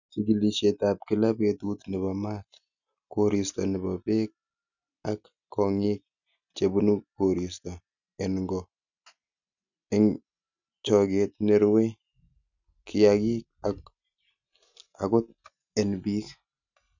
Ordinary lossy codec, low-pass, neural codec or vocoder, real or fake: none; 7.2 kHz; none; real